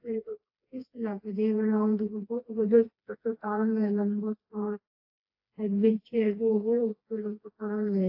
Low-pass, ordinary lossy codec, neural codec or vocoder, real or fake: 5.4 kHz; AAC, 32 kbps; codec, 16 kHz, 2 kbps, FreqCodec, smaller model; fake